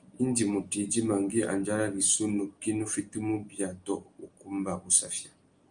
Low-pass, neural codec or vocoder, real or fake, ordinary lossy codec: 9.9 kHz; none; real; Opus, 32 kbps